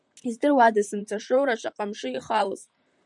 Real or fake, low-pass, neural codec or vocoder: fake; 10.8 kHz; vocoder, 44.1 kHz, 128 mel bands, Pupu-Vocoder